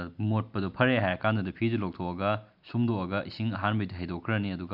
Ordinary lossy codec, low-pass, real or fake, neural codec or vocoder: none; 5.4 kHz; real; none